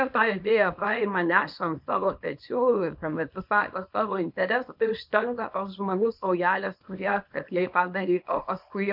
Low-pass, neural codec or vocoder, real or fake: 5.4 kHz; codec, 24 kHz, 0.9 kbps, WavTokenizer, small release; fake